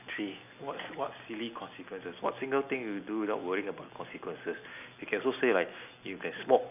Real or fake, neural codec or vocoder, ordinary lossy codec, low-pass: real; none; none; 3.6 kHz